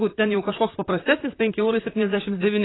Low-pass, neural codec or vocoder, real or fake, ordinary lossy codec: 7.2 kHz; vocoder, 44.1 kHz, 128 mel bands, Pupu-Vocoder; fake; AAC, 16 kbps